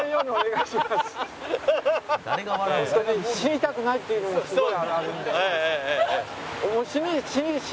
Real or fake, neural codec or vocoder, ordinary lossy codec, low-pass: real; none; none; none